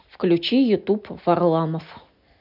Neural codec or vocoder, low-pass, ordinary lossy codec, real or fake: none; 5.4 kHz; none; real